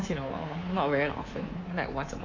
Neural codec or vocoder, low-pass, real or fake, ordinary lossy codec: codec, 24 kHz, 3.1 kbps, DualCodec; 7.2 kHz; fake; AAC, 32 kbps